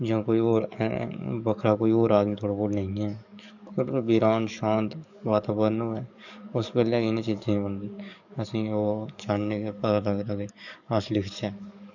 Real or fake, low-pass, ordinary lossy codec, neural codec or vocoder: fake; 7.2 kHz; none; codec, 44.1 kHz, 7.8 kbps, DAC